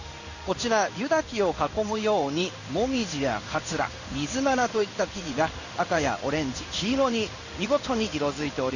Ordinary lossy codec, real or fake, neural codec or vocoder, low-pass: none; fake; codec, 16 kHz in and 24 kHz out, 1 kbps, XY-Tokenizer; 7.2 kHz